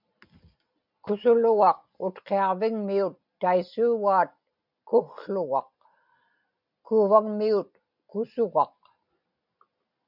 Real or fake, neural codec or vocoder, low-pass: real; none; 5.4 kHz